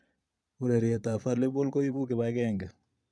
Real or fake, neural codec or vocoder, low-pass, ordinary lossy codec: fake; vocoder, 22.05 kHz, 80 mel bands, Vocos; none; none